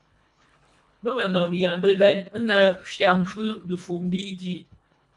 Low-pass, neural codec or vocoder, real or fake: 10.8 kHz; codec, 24 kHz, 1.5 kbps, HILCodec; fake